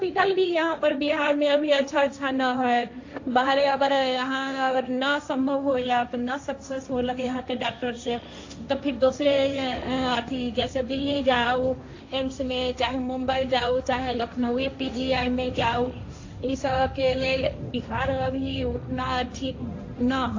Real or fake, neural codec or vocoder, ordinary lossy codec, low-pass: fake; codec, 16 kHz, 1.1 kbps, Voila-Tokenizer; AAC, 48 kbps; 7.2 kHz